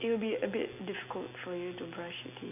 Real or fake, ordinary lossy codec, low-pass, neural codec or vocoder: real; none; 3.6 kHz; none